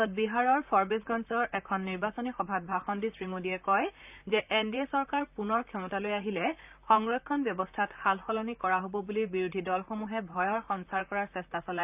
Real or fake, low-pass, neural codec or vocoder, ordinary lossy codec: fake; 3.6 kHz; vocoder, 44.1 kHz, 128 mel bands, Pupu-Vocoder; none